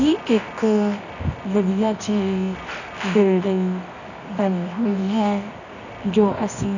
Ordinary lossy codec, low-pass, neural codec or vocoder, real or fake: none; 7.2 kHz; codec, 24 kHz, 0.9 kbps, WavTokenizer, medium music audio release; fake